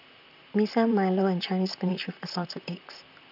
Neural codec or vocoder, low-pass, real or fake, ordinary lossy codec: vocoder, 44.1 kHz, 128 mel bands, Pupu-Vocoder; 5.4 kHz; fake; none